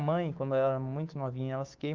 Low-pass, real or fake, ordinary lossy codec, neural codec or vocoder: 7.2 kHz; fake; Opus, 24 kbps; codec, 16 kHz, 6 kbps, DAC